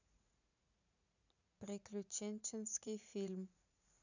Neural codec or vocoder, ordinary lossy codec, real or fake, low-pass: vocoder, 44.1 kHz, 80 mel bands, Vocos; none; fake; 7.2 kHz